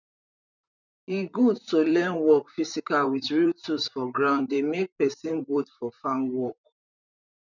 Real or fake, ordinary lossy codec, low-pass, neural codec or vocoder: fake; none; 7.2 kHz; vocoder, 44.1 kHz, 128 mel bands, Pupu-Vocoder